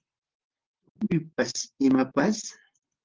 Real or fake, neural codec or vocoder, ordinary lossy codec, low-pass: fake; vocoder, 44.1 kHz, 128 mel bands, Pupu-Vocoder; Opus, 16 kbps; 7.2 kHz